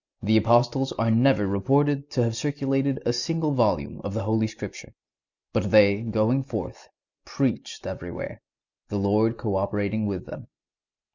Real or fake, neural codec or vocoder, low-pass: real; none; 7.2 kHz